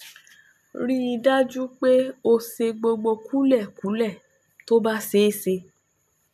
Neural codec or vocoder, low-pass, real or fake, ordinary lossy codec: none; 14.4 kHz; real; none